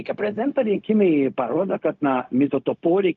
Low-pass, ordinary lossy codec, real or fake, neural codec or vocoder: 7.2 kHz; Opus, 32 kbps; fake; codec, 16 kHz, 0.4 kbps, LongCat-Audio-Codec